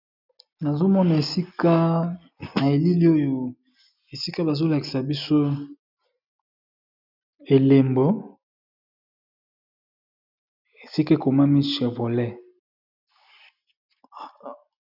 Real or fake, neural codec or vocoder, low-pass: real; none; 5.4 kHz